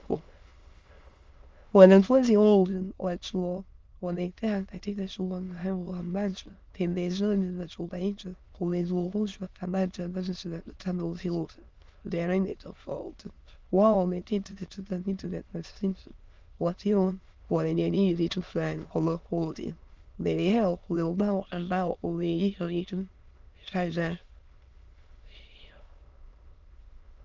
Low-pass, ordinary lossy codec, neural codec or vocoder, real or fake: 7.2 kHz; Opus, 32 kbps; autoencoder, 22.05 kHz, a latent of 192 numbers a frame, VITS, trained on many speakers; fake